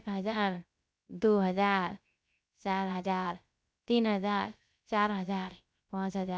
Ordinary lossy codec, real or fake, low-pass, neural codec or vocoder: none; fake; none; codec, 16 kHz, 0.3 kbps, FocalCodec